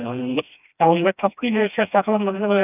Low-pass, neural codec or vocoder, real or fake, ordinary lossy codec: 3.6 kHz; codec, 16 kHz, 1 kbps, FreqCodec, smaller model; fake; none